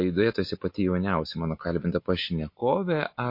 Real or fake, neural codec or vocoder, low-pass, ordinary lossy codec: real; none; 5.4 kHz; MP3, 32 kbps